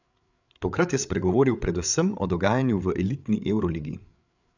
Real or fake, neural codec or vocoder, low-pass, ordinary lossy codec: fake; codec, 16 kHz, 8 kbps, FreqCodec, larger model; 7.2 kHz; none